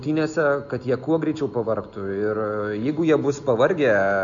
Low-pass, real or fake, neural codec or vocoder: 7.2 kHz; real; none